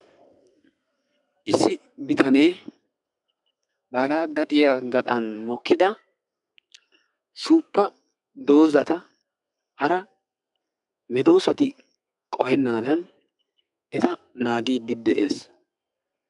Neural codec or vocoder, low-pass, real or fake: codec, 32 kHz, 1.9 kbps, SNAC; 10.8 kHz; fake